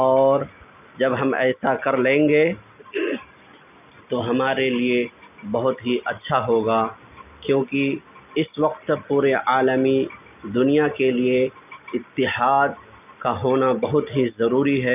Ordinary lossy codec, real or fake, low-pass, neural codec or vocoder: none; real; 3.6 kHz; none